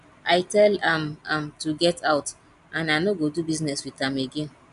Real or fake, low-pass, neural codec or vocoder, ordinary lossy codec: real; 10.8 kHz; none; none